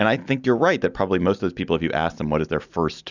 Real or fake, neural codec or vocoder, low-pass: real; none; 7.2 kHz